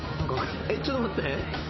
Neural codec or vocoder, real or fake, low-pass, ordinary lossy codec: none; real; 7.2 kHz; MP3, 24 kbps